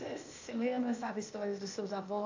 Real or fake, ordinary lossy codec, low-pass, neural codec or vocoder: fake; AAC, 48 kbps; 7.2 kHz; codec, 16 kHz, 0.8 kbps, ZipCodec